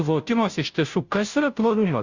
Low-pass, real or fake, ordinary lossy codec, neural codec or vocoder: 7.2 kHz; fake; Opus, 64 kbps; codec, 16 kHz, 0.5 kbps, FunCodec, trained on Chinese and English, 25 frames a second